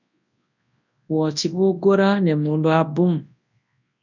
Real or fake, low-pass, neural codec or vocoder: fake; 7.2 kHz; codec, 24 kHz, 0.9 kbps, WavTokenizer, large speech release